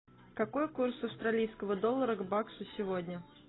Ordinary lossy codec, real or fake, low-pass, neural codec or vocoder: AAC, 16 kbps; real; 7.2 kHz; none